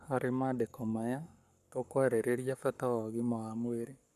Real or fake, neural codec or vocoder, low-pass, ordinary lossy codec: fake; codec, 44.1 kHz, 7.8 kbps, Pupu-Codec; 14.4 kHz; none